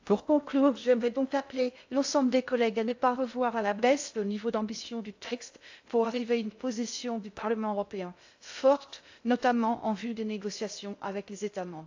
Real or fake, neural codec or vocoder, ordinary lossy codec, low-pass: fake; codec, 16 kHz in and 24 kHz out, 0.6 kbps, FocalCodec, streaming, 2048 codes; AAC, 48 kbps; 7.2 kHz